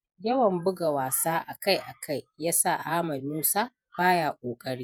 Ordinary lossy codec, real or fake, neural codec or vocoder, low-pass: none; fake; vocoder, 48 kHz, 128 mel bands, Vocos; none